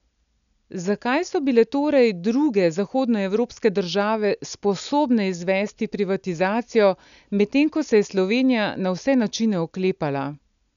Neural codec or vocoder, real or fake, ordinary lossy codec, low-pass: none; real; none; 7.2 kHz